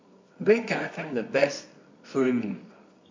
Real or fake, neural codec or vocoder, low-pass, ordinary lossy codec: fake; codec, 24 kHz, 0.9 kbps, WavTokenizer, medium music audio release; 7.2 kHz; MP3, 48 kbps